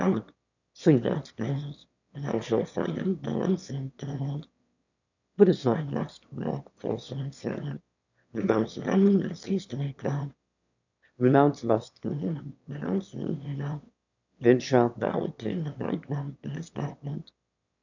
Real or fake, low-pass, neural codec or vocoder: fake; 7.2 kHz; autoencoder, 22.05 kHz, a latent of 192 numbers a frame, VITS, trained on one speaker